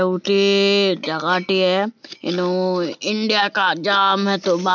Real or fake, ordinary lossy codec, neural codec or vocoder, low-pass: real; none; none; 7.2 kHz